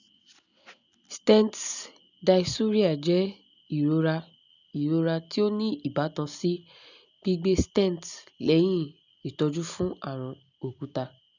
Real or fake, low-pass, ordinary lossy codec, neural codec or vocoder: real; 7.2 kHz; none; none